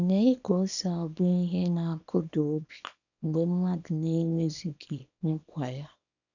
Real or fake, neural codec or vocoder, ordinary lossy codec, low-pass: fake; codec, 24 kHz, 0.9 kbps, WavTokenizer, small release; none; 7.2 kHz